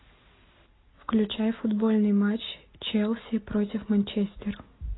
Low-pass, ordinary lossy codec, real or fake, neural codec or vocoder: 7.2 kHz; AAC, 16 kbps; real; none